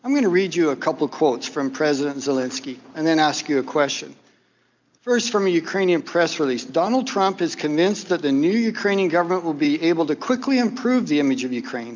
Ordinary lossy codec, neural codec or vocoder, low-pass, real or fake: MP3, 64 kbps; none; 7.2 kHz; real